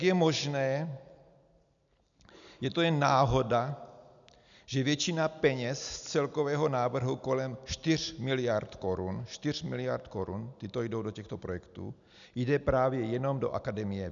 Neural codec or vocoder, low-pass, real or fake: none; 7.2 kHz; real